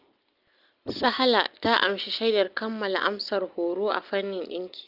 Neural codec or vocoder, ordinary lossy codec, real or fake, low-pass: none; Opus, 24 kbps; real; 5.4 kHz